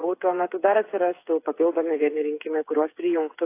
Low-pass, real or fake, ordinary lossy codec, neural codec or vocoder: 3.6 kHz; fake; AAC, 24 kbps; codec, 16 kHz, 8 kbps, FreqCodec, smaller model